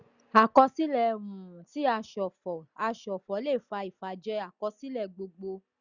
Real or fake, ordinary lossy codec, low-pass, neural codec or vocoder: real; none; 7.2 kHz; none